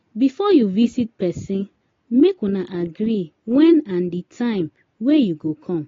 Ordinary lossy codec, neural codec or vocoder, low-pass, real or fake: AAC, 32 kbps; none; 7.2 kHz; real